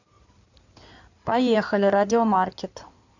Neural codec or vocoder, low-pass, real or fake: codec, 16 kHz in and 24 kHz out, 2.2 kbps, FireRedTTS-2 codec; 7.2 kHz; fake